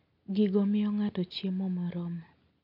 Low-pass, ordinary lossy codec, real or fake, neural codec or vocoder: 5.4 kHz; none; real; none